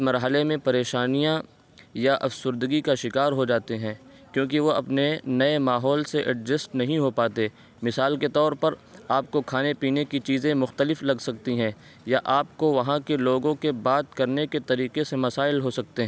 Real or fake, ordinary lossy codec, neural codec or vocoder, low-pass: real; none; none; none